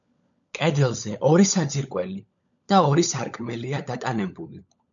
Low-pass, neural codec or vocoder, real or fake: 7.2 kHz; codec, 16 kHz, 16 kbps, FunCodec, trained on LibriTTS, 50 frames a second; fake